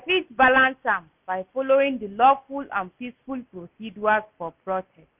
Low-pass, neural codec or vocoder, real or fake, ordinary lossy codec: 3.6 kHz; none; real; none